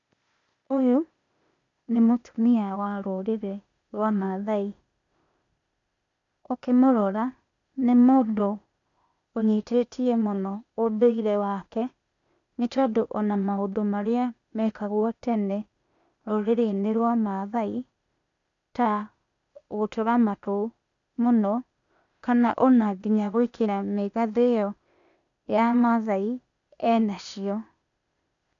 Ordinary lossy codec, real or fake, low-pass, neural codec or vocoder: none; fake; 7.2 kHz; codec, 16 kHz, 0.8 kbps, ZipCodec